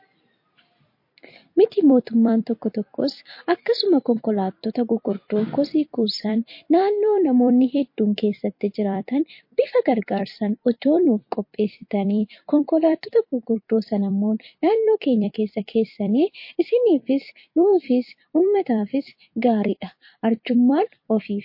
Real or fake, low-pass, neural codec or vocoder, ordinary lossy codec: fake; 5.4 kHz; vocoder, 44.1 kHz, 128 mel bands every 256 samples, BigVGAN v2; MP3, 32 kbps